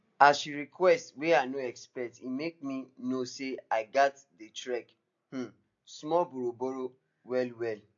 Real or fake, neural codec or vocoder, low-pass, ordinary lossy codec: real; none; 7.2 kHz; AAC, 48 kbps